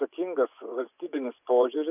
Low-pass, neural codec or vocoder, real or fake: 3.6 kHz; vocoder, 24 kHz, 100 mel bands, Vocos; fake